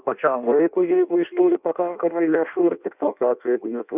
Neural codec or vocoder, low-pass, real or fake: codec, 16 kHz in and 24 kHz out, 0.6 kbps, FireRedTTS-2 codec; 3.6 kHz; fake